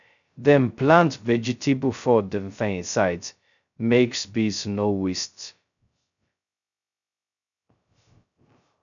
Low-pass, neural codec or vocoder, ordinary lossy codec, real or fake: 7.2 kHz; codec, 16 kHz, 0.2 kbps, FocalCodec; none; fake